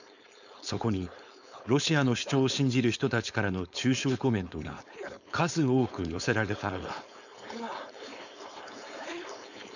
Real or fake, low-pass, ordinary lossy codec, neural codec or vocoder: fake; 7.2 kHz; none; codec, 16 kHz, 4.8 kbps, FACodec